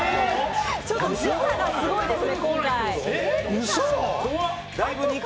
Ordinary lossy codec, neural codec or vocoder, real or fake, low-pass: none; none; real; none